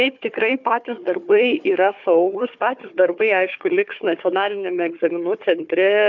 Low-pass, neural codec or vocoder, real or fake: 7.2 kHz; codec, 16 kHz, 4 kbps, FunCodec, trained on Chinese and English, 50 frames a second; fake